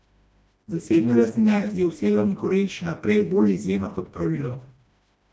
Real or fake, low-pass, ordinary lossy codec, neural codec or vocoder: fake; none; none; codec, 16 kHz, 1 kbps, FreqCodec, smaller model